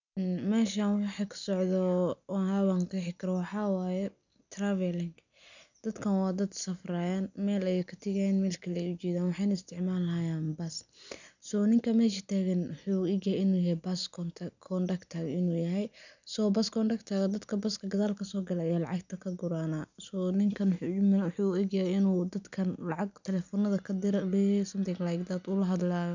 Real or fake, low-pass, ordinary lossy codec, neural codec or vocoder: real; 7.2 kHz; none; none